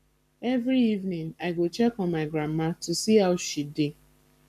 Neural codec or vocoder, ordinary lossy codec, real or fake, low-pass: codec, 44.1 kHz, 7.8 kbps, Pupu-Codec; none; fake; 14.4 kHz